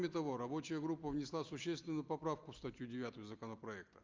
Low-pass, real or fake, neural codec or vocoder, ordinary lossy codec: 7.2 kHz; real; none; Opus, 32 kbps